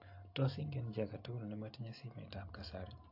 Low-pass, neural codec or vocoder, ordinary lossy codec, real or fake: 5.4 kHz; none; AAC, 48 kbps; real